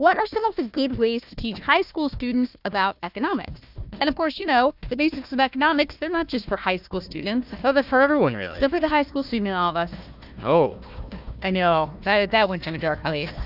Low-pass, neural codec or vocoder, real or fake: 5.4 kHz; codec, 16 kHz, 1 kbps, FunCodec, trained on Chinese and English, 50 frames a second; fake